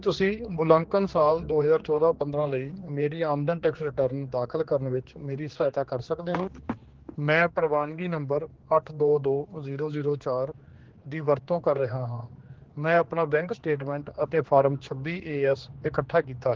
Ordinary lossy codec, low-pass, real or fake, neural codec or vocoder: Opus, 16 kbps; 7.2 kHz; fake; codec, 16 kHz, 2 kbps, X-Codec, HuBERT features, trained on general audio